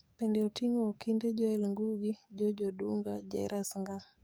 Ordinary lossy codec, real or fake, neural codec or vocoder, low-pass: none; fake; codec, 44.1 kHz, 7.8 kbps, DAC; none